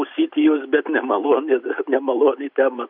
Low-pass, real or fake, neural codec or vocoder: 5.4 kHz; real; none